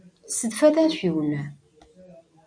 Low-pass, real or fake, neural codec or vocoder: 9.9 kHz; real; none